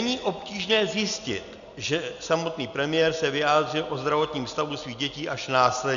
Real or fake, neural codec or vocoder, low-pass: real; none; 7.2 kHz